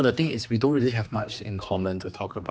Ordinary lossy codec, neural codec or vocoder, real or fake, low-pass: none; codec, 16 kHz, 2 kbps, X-Codec, HuBERT features, trained on general audio; fake; none